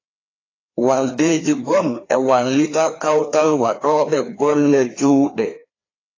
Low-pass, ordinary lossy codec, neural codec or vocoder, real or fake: 7.2 kHz; AAC, 32 kbps; codec, 16 kHz, 2 kbps, FreqCodec, larger model; fake